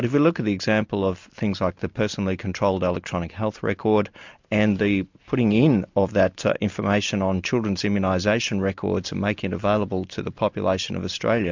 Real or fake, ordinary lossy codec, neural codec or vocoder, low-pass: real; MP3, 64 kbps; none; 7.2 kHz